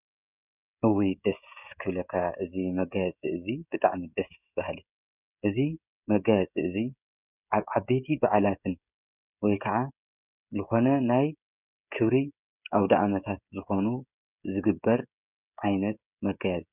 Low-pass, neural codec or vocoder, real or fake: 3.6 kHz; codec, 16 kHz, 16 kbps, FreqCodec, smaller model; fake